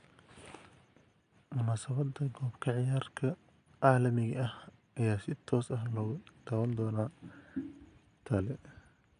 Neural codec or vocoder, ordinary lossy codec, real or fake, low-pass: none; AAC, 96 kbps; real; 9.9 kHz